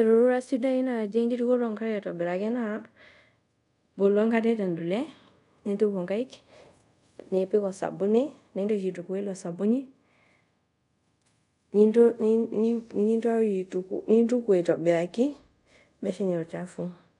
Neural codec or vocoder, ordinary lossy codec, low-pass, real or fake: codec, 24 kHz, 0.5 kbps, DualCodec; none; 10.8 kHz; fake